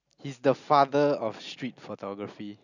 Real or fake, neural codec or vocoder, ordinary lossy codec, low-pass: real; none; none; 7.2 kHz